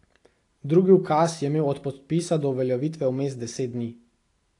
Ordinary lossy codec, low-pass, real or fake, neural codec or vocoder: AAC, 48 kbps; 10.8 kHz; real; none